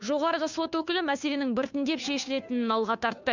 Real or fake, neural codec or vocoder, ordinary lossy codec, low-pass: fake; codec, 16 kHz, 2 kbps, FunCodec, trained on Chinese and English, 25 frames a second; none; 7.2 kHz